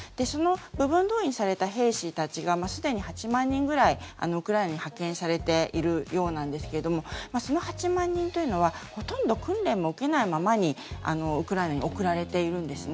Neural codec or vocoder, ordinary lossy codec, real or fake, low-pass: none; none; real; none